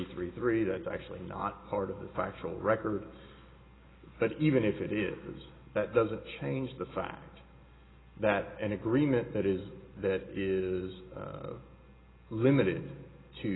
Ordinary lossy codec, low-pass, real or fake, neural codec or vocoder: AAC, 16 kbps; 7.2 kHz; real; none